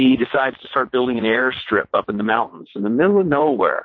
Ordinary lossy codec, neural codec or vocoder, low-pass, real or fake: MP3, 32 kbps; vocoder, 22.05 kHz, 80 mel bands, WaveNeXt; 7.2 kHz; fake